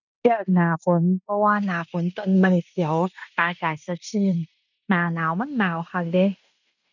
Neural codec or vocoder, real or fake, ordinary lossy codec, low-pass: codec, 16 kHz in and 24 kHz out, 0.9 kbps, LongCat-Audio-Codec, fine tuned four codebook decoder; fake; none; 7.2 kHz